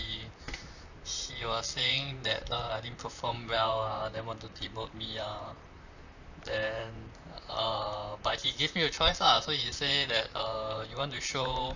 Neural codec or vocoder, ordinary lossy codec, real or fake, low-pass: vocoder, 44.1 kHz, 128 mel bands, Pupu-Vocoder; none; fake; 7.2 kHz